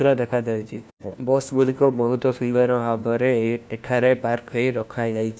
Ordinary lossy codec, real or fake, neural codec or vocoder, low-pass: none; fake; codec, 16 kHz, 1 kbps, FunCodec, trained on LibriTTS, 50 frames a second; none